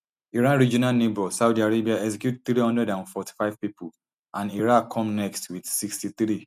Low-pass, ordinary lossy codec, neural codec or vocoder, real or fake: 14.4 kHz; none; none; real